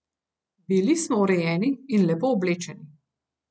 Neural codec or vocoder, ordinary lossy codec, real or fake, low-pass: none; none; real; none